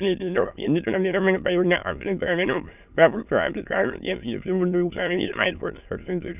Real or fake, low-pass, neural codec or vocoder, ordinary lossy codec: fake; 3.6 kHz; autoencoder, 22.05 kHz, a latent of 192 numbers a frame, VITS, trained on many speakers; none